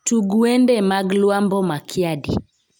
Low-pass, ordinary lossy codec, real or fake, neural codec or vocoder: 19.8 kHz; none; real; none